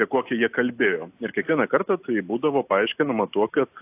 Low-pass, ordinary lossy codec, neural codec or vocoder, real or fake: 3.6 kHz; AAC, 32 kbps; none; real